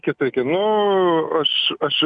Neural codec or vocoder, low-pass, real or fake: none; 10.8 kHz; real